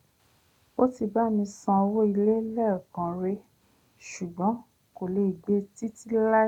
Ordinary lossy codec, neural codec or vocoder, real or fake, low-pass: none; none; real; 19.8 kHz